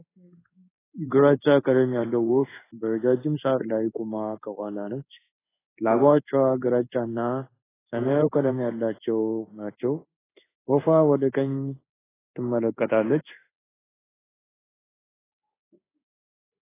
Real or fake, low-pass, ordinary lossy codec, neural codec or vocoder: fake; 3.6 kHz; AAC, 16 kbps; codec, 16 kHz in and 24 kHz out, 1 kbps, XY-Tokenizer